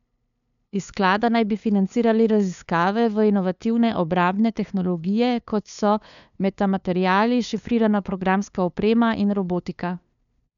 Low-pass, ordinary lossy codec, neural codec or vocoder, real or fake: 7.2 kHz; none; codec, 16 kHz, 2 kbps, FunCodec, trained on LibriTTS, 25 frames a second; fake